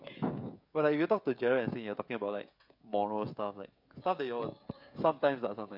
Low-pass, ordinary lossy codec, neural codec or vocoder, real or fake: 5.4 kHz; MP3, 48 kbps; codec, 16 kHz, 16 kbps, FreqCodec, smaller model; fake